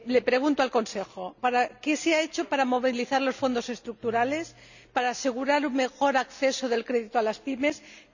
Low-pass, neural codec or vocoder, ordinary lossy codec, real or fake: 7.2 kHz; none; none; real